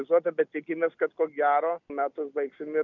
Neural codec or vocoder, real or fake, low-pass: none; real; 7.2 kHz